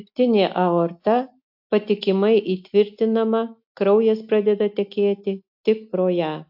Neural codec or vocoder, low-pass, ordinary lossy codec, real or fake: none; 5.4 kHz; AAC, 48 kbps; real